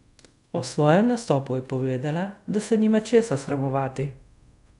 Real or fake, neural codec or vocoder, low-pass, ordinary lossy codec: fake; codec, 24 kHz, 0.5 kbps, DualCodec; 10.8 kHz; none